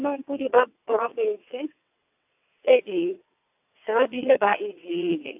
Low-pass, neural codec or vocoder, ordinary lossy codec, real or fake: 3.6 kHz; vocoder, 22.05 kHz, 80 mel bands, WaveNeXt; AAC, 32 kbps; fake